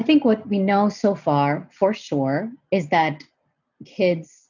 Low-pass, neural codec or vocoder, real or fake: 7.2 kHz; none; real